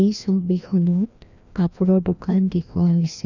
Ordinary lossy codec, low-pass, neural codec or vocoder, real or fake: none; 7.2 kHz; codec, 16 kHz, 1 kbps, FreqCodec, larger model; fake